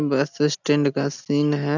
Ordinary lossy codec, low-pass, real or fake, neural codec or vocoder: none; 7.2 kHz; real; none